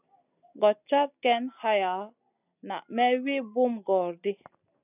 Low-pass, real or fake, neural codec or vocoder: 3.6 kHz; real; none